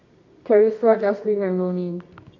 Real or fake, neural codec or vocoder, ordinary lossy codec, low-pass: fake; codec, 24 kHz, 0.9 kbps, WavTokenizer, medium music audio release; MP3, 64 kbps; 7.2 kHz